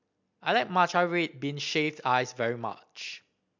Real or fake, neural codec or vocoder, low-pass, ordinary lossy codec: real; none; 7.2 kHz; MP3, 64 kbps